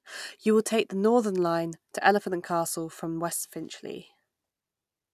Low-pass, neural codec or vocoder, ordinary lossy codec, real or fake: 14.4 kHz; none; none; real